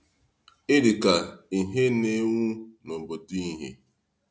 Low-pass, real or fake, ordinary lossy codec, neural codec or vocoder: none; real; none; none